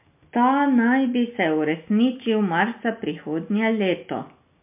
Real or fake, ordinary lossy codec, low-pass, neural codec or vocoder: real; MP3, 32 kbps; 3.6 kHz; none